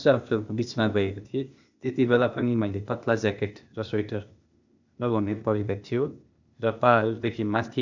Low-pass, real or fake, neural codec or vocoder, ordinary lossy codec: 7.2 kHz; fake; codec, 16 kHz, 0.8 kbps, ZipCodec; none